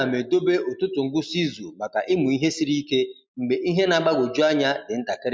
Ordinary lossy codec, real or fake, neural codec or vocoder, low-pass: none; real; none; none